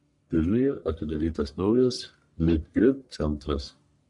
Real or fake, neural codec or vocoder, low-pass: fake; codec, 44.1 kHz, 1.7 kbps, Pupu-Codec; 10.8 kHz